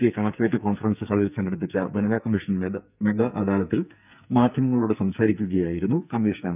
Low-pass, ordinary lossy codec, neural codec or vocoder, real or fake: 3.6 kHz; none; codec, 44.1 kHz, 2.6 kbps, SNAC; fake